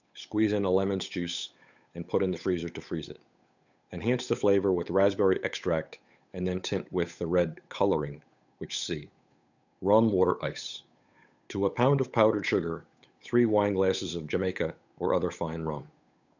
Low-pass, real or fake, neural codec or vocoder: 7.2 kHz; fake; codec, 16 kHz, 8 kbps, FunCodec, trained on Chinese and English, 25 frames a second